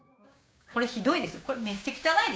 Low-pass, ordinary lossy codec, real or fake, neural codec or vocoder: none; none; fake; codec, 16 kHz, 6 kbps, DAC